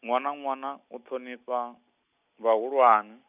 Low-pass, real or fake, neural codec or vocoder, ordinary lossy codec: 3.6 kHz; real; none; none